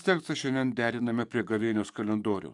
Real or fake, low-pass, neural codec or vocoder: fake; 10.8 kHz; codec, 44.1 kHz, 7.8 kbps, DAC